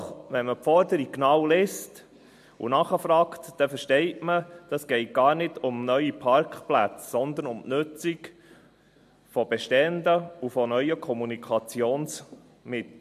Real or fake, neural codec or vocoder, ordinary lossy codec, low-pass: real; none; MP3, 64 kbps; 14.4 kHz